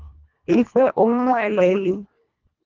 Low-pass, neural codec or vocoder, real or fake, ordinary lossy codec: 7.2 kHz; codec, 24 kHz, 1.5 kbps, HILCodec; fake; Opus, 24 kbps